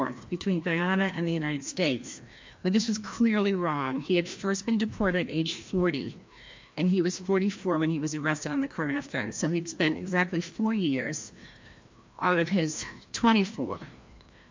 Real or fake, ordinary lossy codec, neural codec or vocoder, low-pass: fake; MP3, 48 kbps; codec, 16 kHz, 1 kbps, FreqCodec, larger model; 7.2 kHz